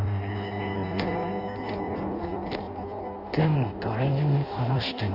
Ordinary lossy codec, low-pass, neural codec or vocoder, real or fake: none; 5.4 kHz; codec, 16 kHz in and 24 kHz out, 0.6 kbps, FireRedTTS-2 codec; fake